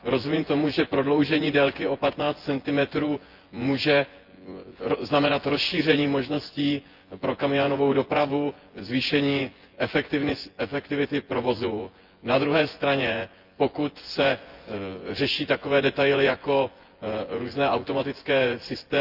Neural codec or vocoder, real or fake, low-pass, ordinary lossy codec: vocoder, 24 kHz, 100 mel bands, Vocos; fake; 5.4 kHz; Opus, 32 kbps